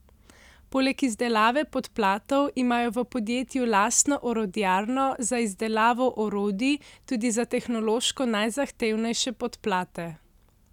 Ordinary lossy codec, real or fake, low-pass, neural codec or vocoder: none; real; 19.8 kHz; none